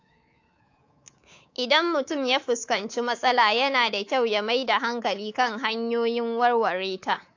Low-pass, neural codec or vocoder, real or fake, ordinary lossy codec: 7.2 kHz; codec, 24 kHz, 3.1 kbps, DualCodec; fake; AAC, 48 kbps